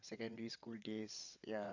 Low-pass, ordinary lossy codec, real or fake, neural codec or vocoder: 7.2 kHz; none; fake; vocoder, 22.05 kHz, 80 mel bands, WaveNeXt